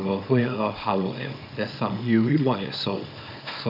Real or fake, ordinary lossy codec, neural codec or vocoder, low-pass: fake; none; codec, 24 kHz, 0.9 kbps, WavTokenizer, small release; 5.4 kHz